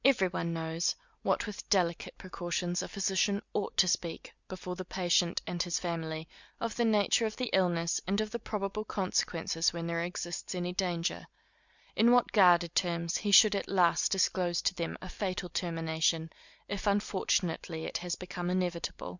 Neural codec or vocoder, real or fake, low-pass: none; real; 7.2 kHz